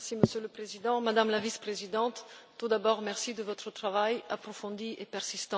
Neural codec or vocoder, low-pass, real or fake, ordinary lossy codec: none; none; real; none